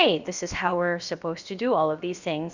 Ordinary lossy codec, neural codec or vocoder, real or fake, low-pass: Opus, 64 kbps; codec, 16 kHz, about 1 kbps, DyCAST, with the encoder's durations; fake; 7.2 kHz